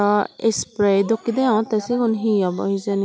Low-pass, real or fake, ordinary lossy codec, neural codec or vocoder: none; real; none; none